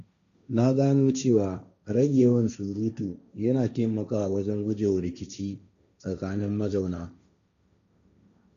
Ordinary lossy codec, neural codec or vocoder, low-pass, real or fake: none; codec, 16 kHz, 1.1 kbps, Voila-Tokenizer; 7.2 kHz; fake